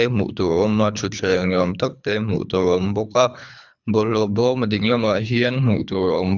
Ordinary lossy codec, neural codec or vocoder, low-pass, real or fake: none; codec, 24 kHz, 3 kbps, HILCodec; 7.2 kHz; fake